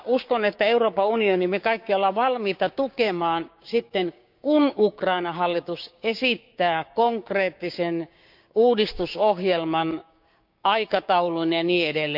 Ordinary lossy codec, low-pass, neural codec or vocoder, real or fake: none; 5.4 kHz; codec, 16 kHz, 6 kbps, DAC; fake